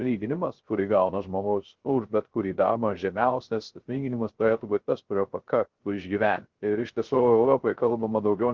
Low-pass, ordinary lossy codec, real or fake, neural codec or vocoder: 7.2 kHz; Opus, 16 kbps; fake; codec, 16 kHz, 0.3 kbps, FocalCodec